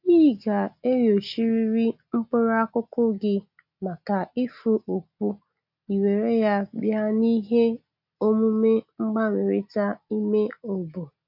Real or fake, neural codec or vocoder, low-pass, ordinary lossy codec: real; none; 5.4 kHz; none